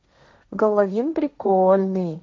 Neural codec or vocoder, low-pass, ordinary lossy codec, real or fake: codec, 16 kHz, 1.1 kbps, Voila-Tokenizer; none; none; fake